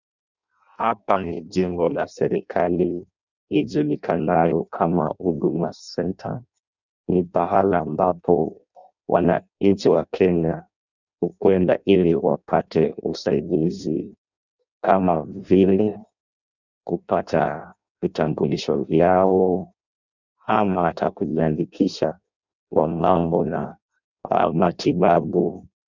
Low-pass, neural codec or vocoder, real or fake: 7.2 kHz; codec, 16 kHz in and 24 kHz out, 0.6 kbps, FireRedTTS-2 codec; fake